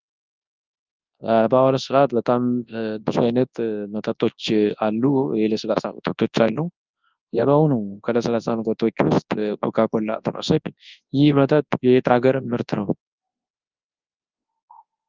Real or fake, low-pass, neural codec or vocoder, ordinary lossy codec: fake; 7.2 kHz; codec, 24 kHz, 0.9 kbps, WavTokenizer, large speech release; Opus, 32 kbps